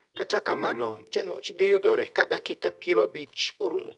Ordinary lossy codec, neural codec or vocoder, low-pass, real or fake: none; codec, 24 kHz, 0.9 kbps, WavTokenizer, medium music audio release; 10.8 kHz; fake